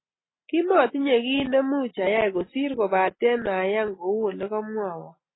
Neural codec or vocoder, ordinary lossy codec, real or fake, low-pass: none; AAC, 16 kbps; real; 7.2 kHz